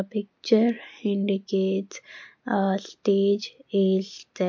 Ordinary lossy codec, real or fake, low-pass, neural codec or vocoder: MP3, 48 kbps; real; 7.2 kHz; none